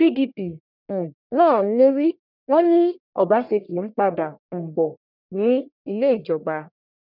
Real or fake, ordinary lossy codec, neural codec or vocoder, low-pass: fake; none; codec, 44.1 kHz, 1.7 kbps, Pupu-Codec; 5.4 kHz